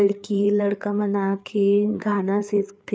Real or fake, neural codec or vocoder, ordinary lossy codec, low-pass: fake; codec, 16 kHz, 4 kbps, FreqCodec, larger model; none; none